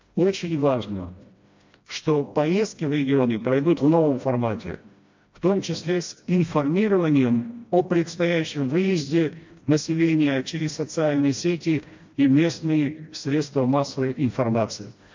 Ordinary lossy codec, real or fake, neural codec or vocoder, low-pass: MP3, 48 kbps; fake; codec, 16 kHz, 1 kbps, FreqCodec, smaller model; 7.2 kHz